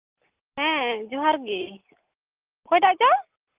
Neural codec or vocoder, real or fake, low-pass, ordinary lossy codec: none; real; 3.6 kHz; Opus, 24 kbps